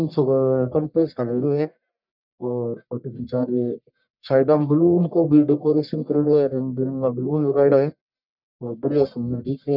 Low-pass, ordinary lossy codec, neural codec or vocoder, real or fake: 5.4 kHz; none; codec, 44.1 kHz, 1.7 kbps, Pupu-Codec; fake